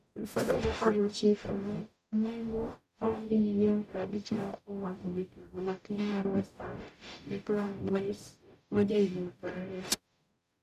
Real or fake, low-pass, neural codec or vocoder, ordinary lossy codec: fake; 14.4 kHz; codec, 44.1 kHz, 0.9 kbps, DAC; none